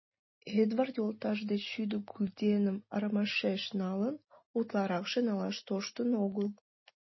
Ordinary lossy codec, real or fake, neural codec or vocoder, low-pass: MP3, 24 kbps; real; none; 7.2 kHz